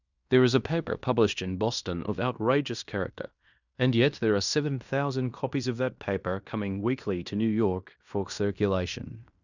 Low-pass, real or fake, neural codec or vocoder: 7.2 kHz; fake; codec, 16 kHz in and 24 kHz out, 0.9 kbps, LongCat-Audio-Codec, fine tuned four codebook decoder